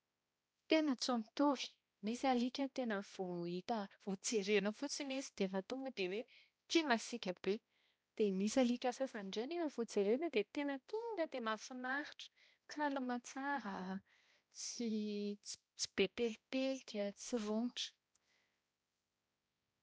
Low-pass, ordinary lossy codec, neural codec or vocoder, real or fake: none; none; codec, 16 kHz, 1 kbps, X-Codec, HuBERT features, trained on balanced general audio; fake